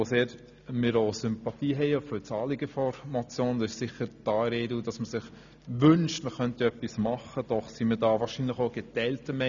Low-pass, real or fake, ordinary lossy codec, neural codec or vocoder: 7.2 kHz; real; none; none